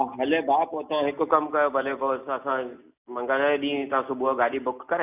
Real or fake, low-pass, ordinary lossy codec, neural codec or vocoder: real; 3.6 kHz; none; none